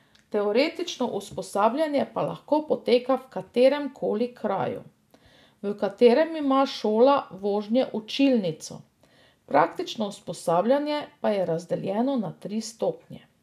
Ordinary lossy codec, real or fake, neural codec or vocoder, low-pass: none; real; none; 14.4 kHz